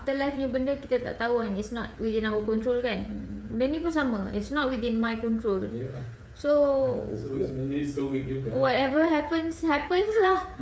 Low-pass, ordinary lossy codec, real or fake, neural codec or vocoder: none; none; fake; codec, 16 kHz, 8 kbps, FreqCodec, smaller model